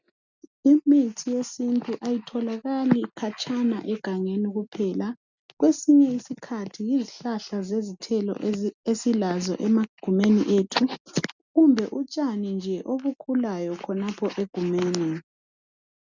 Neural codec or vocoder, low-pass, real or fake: none; 7.2 kHz; real